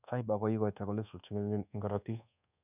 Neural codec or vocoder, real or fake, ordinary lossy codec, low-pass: codec, 24 kHz, 1.2 kbps, DualCodec; fake; none; 3.6 kHz